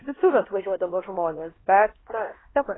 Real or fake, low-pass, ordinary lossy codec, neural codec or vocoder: fake; 7.2 kHz; AAC, 16 kbps; codec, 16 kHz, 1 kbps, X-Codec, HuBERT features, trained on LibriSpeech